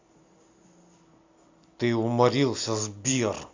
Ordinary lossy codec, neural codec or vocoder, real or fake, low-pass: AAC, 48 kbps; none; real; 7.2 kHz